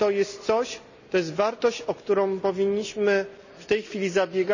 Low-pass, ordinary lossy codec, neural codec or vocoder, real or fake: 7.2 kHz; none; none; real